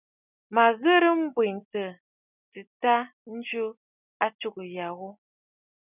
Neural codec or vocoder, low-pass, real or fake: none; 3.6 kHz; real